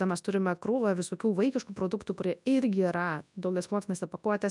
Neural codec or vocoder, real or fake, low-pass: codec, 24 kHz, 0.9 kbps, WavTokenizer, large speech release; fake; 10.8 kHz